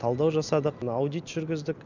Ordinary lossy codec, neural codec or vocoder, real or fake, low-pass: none; none; real; 7.2 kHz